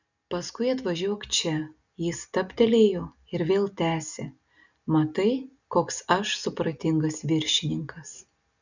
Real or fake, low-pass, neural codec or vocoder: real; 7.2 kHz; none